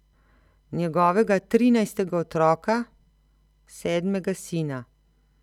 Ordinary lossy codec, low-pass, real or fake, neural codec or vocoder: none; 19.8 kHz; real; none